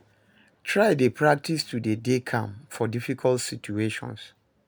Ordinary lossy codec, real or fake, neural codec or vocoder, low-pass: none; real; none; none